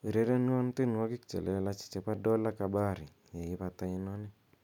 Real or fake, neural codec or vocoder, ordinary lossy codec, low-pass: real; none; none; 19.8 kHz